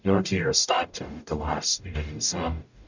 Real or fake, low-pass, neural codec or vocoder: fake; 7.2 kHz; codec, 44.1 kHz, 0.9 kbps, DAC